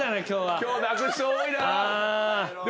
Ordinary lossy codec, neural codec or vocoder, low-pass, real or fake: none; none; none; real